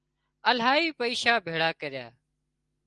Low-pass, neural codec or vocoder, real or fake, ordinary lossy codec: 10.8 kHz; none; real; Opus, 24 kbps